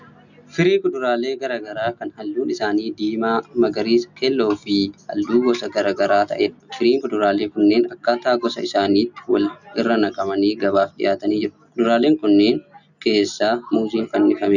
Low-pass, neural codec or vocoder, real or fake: 7.2 kHz; none; real